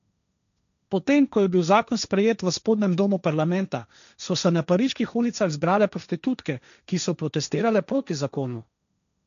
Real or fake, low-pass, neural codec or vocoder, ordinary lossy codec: fake; 7.2 kHz; codec, 16 kHz, 1.1 kbps, Voila-Tokenizer; none